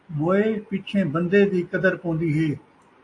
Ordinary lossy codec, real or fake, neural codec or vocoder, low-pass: AAC, 48 kbps; real; none; 9.9 kHz